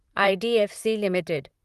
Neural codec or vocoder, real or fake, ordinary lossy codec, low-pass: vocoder, 44.1 kHz, 128 mel bands, Pupu-Vocoder; fake; Opus, 24 kbps; 14.4 kHz